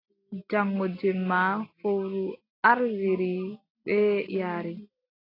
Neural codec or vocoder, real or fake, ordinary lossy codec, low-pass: none; real; AAC, 24 kbps; 5.4 kHz